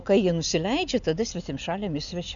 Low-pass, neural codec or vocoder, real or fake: 7.2 kHz; none; real